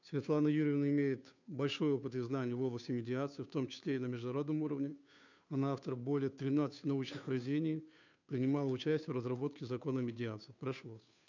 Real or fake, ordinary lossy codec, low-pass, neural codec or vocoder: fake; none; 7.2 kHz; codec, 16 kHz, 2 kbps, FunCodec, trained on Chinese and English, 25 frames a second